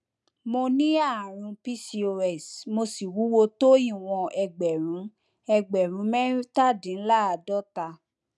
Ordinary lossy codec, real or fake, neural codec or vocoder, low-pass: none; real; none; none